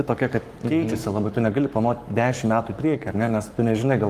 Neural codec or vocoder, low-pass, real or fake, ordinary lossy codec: codec, 44.1 kHz, 7.8 kbps, Pupu-Codec; 14.4 kHz; fake; Opus, 32 kbps